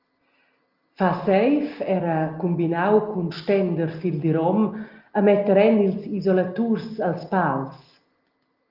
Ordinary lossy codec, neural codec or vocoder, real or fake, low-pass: Opus, 32 kbps; none; real; 5.4 kHz